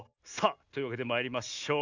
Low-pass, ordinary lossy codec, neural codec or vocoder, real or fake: 7.2 kHz; none; none; real